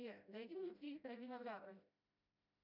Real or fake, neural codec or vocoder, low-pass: fake; codec, 16 kHz, 0.5 kbps, FreqCodec, smaller model; 5.4 kHz